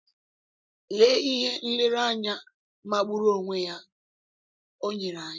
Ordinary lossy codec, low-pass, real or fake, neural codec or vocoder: none; none; real; none